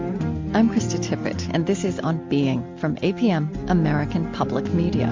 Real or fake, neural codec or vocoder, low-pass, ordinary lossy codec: real; none; 7.2 kHz; MP3, 48 kbps